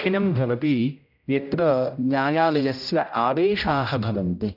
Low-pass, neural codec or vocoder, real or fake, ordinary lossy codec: 5.4 kHz; codec, 16 kHz, 0.5 kbps, X-Codec, HuBERT features, trained on general audio; fake; none